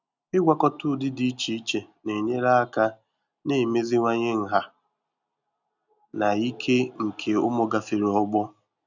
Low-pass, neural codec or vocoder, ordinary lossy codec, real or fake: 7.2 kHz; none; none; real